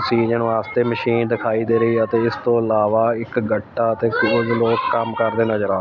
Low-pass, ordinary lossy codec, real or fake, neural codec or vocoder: none; none; real; none